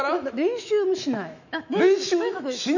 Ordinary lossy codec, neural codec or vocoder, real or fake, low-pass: none; autoencoder, 48 kHz, 128 numbers a frame, DAC-VAE, trained on Japanese speech; fake; 7.2 kHz